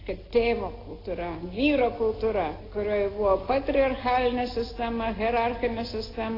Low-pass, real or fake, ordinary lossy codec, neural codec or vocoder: 5.4 kHz; real; AAC, 24 kbps; none